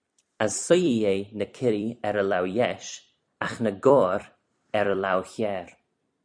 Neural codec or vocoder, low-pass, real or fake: vocoder, 44.1 kHz, 128 mel bands every 256 samples, BigVGAN v2; 9.9 kHz; fake